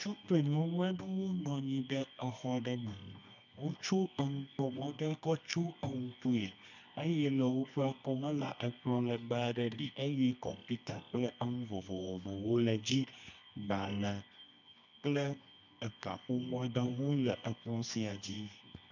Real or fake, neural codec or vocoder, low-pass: fake; codec, 24 kHz, 0.9 kbps, WavTokenizer, medium music audio release; 7.2 kHz